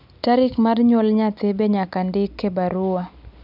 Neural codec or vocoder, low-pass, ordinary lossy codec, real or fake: none; 5.4 kHz; none; real